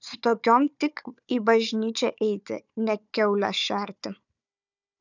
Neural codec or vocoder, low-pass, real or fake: codec, 16 kHz, 4 kbps, FunCodec, trained on Chinese and English, 50 frames a second; 7.2 kHz; fake